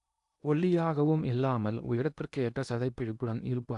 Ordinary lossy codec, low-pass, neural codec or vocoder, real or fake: none; 10.8 kHz; codec, 16 kHz in and 24 kHz out, 0.8 kbps, FocalCodec, streaming, 65536 codes; fake